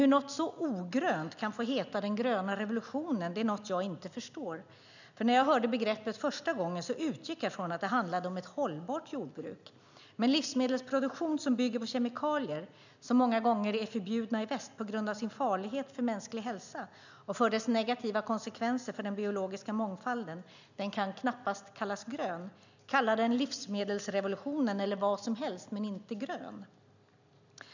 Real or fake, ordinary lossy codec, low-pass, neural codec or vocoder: real; none; 7.2 kHz; none